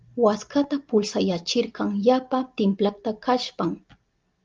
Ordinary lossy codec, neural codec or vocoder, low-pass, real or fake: Opus, 24 kbps; none; 7.2 kHz; real